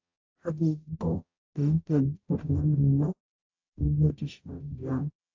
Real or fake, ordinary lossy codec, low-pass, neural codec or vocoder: fake; AAC, 48 kbps; 7.2 kHz; codec, 44.1 kHz, 0.9 kbps, DAC